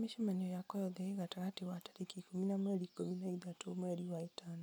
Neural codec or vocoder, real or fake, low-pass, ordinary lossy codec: none; real; none; none